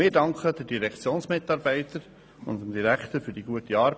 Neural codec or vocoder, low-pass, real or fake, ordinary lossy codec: none; none; real; none